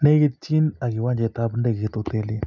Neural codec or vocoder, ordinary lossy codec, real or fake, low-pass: none; none; real; 7.2 kHz